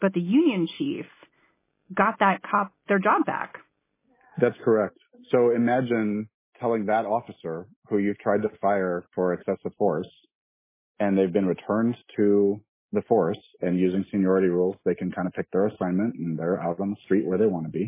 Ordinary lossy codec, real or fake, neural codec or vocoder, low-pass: MP3, 16 kbps; real; none; 3.6 kHz